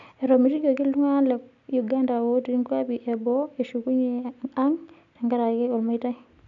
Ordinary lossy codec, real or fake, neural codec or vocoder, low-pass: none; real; none; 7.2 kHz